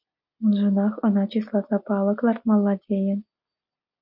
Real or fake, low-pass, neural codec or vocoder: real; 5.4 kHz; none